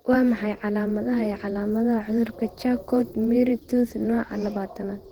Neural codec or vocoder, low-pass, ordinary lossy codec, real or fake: vocoder, 48 kHz, 128 mel bands, Vocos; 19.8 kHz; Opus, 16 kbps; fake